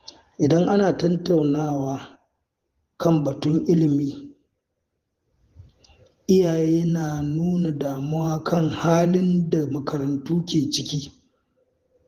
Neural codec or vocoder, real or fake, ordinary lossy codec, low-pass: vocoder, 48 kHz, 128 mel bands, Vocos; fake; Opus, 24 kbps; 14.4 kHz